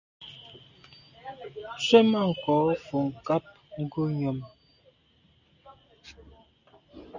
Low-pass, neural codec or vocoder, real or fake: 7.2 kHz; none; real